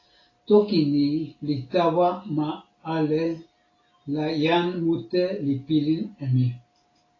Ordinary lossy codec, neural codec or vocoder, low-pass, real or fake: AAC, 32 kbps; none; 7.2 kHz; real